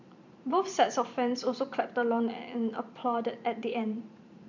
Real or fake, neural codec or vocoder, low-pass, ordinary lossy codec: fake; vocoder, 44.1 kHz, 128 mel bands every 512 samples, BigVGAN v2; 7.2 kHz; none